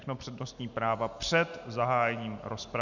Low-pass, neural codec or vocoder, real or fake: 7.2 kHz; none; real